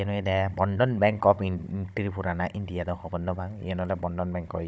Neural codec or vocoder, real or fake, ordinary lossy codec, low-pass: codec, 16 kHz, 16 kbps, FunCodec, trained on Chinese and English, 50 frames a second; fake; none; none